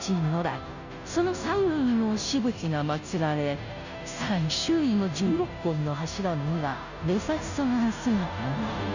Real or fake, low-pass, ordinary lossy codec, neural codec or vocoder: fake; 7.2 kHz; none; codec, 16 kHz, 0.5 kbps, FunCodec, trained on Chinese and English, 25 frames a second